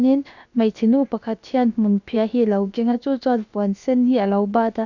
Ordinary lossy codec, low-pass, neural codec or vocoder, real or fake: none; 7.2 kHz; codec, 16 kHz, about 1 kbps, DyCAST, with the encoder's durations; fake